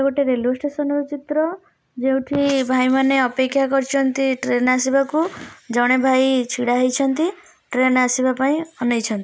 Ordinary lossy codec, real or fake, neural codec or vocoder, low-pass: none; real; none; none